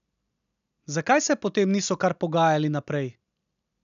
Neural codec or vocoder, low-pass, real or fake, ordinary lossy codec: none; 7.2 kHz; real; MP3, 96 kbps